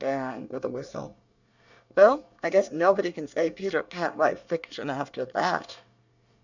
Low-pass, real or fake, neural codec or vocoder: 7.2 kHz; fake; codec, 24 kHz, 1 kbps, SNAC